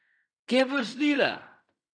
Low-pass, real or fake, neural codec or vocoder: 9.9 kHz; fake; codec, 16 kHz in and 24 kHz out, 0.4 kbps, LongCat-Audio-Codec, fine tuned four codebook decoder